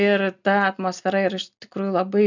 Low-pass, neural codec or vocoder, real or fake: 7.2 kHz; none; real